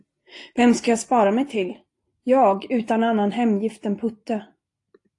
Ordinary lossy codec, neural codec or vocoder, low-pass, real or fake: AAC, 48 kbps; none; 10.8 kHz; real